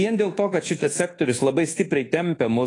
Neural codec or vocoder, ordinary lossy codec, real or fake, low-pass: codec, 24 kHz, 1.2 kbps, DualCodec; AAC, 32 kbps; fake; 10.8 kHz